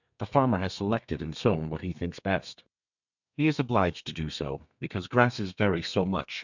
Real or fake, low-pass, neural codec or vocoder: fake; 7.2 kHz; codec, 32 kHz, 1.9 kbps, SNAC